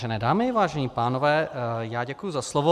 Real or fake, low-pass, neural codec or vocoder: real; 14.4 kHz; none